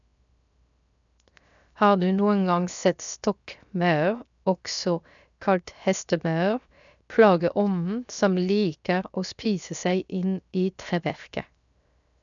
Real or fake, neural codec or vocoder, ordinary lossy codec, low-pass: fake; codec, 16 kHz, 0.7 kbps, FocalCodec; none; 7.2 kHz